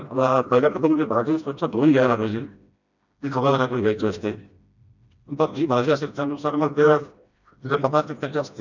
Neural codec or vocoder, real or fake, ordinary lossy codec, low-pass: codec, 16 kHz, 1 kbps, FreqCodec, smaller model; fake; none; 7.2 kHz